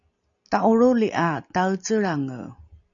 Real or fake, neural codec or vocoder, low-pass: real; none; 7.2 kHz